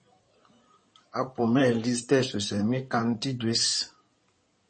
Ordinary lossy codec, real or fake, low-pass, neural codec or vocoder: MP3, 32 kbps; fake; 9.9 kHz; codec, 16 kHz in and 24 kHz out, 2.2 kbps, FireRedTTS-2 codec